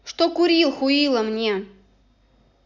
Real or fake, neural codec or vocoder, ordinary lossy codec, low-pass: real; none; none; 7.2 kHz